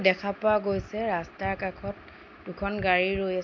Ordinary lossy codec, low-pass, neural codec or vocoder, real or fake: MP3, 64 kbps; 7.2 kHz; none; real